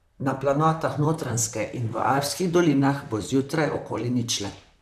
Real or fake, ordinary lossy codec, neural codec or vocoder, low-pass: fake; none; vocoder, 44.1 kHz, 128 mel bands, Pupu-Vocoder; 14.4 kHz